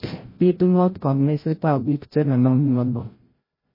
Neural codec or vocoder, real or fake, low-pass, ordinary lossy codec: codec, 16 kHz, 0.5 kbps, FreqCodec, larger model; fake; 5.4 kHz; MP3, 24 kbps